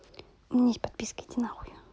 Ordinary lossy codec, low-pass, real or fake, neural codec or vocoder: none; none; real; none